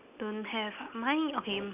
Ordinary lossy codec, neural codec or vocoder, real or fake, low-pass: none; none; real; 3.6 kHz